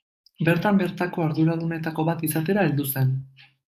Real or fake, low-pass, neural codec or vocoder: fake; 14.4 kHz; codec, 44.1 kHz, 7.8 kbps, DAC